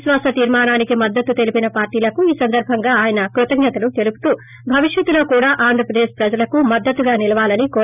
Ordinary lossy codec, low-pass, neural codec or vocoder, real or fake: none; 3.6 kHz; none; real